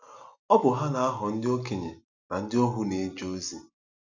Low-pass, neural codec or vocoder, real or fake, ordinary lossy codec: 7.2 kHz; none; real; none